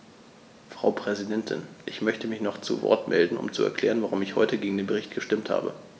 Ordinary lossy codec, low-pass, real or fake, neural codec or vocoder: none; none; real; none